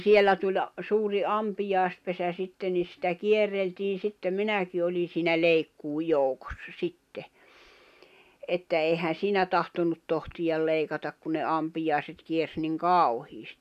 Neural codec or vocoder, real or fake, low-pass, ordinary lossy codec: none; real; 14.4 kHz; none